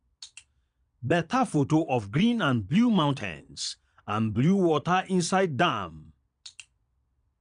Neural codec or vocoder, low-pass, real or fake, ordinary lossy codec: vocoder, 22.05 kHz, 80 mel bands, Vocos; 9.9 kHz; fake; none